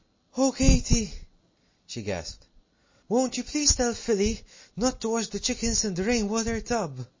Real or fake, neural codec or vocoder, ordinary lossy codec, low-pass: real; none; MP3, 32 kbps; 7.2 kHz